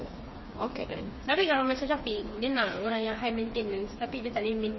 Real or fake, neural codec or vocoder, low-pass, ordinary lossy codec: fake; codec, 16 kHz, 4 kbps, FreqCodec, smaller model; 7.2 kHz; MP3, 24 kbps